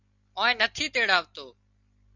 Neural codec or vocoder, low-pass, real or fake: none; 7.2 kHz; real